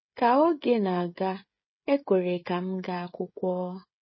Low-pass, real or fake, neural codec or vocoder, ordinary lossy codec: 7.2 kHz; fake; codec, 16 kHz, 16 kbps, FreqCodec, smaller model; MP3, 24 kbps